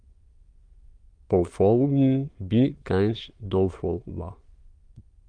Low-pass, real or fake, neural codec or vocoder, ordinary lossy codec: 9.9 kHz; fake; autoencoder, 22.05 kHz, a latent of 192 numbers a frame, VITS, trained on many speakers; Opus, 32 kbps